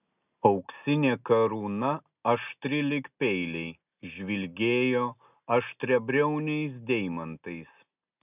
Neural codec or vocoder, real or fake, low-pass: none; real; 3.6 kHz